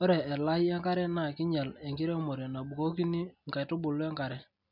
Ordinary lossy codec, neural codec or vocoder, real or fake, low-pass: none; none; real; 5.4 kHz